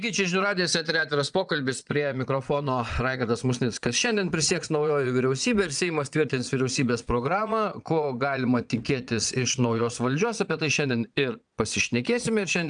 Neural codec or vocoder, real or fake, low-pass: vocoder, 22.05 kHz, 80 mel bands, Vocos; fake; 9.9 kHz